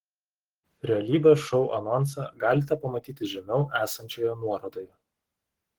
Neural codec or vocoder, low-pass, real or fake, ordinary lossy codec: codec, 44.1 kHz, 7.8 kbps, Pupu-Codec; 19.8 kHz; fake; Opus, 16 kbps